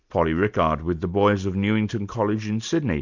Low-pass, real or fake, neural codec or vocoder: 7.2 kHz; real; none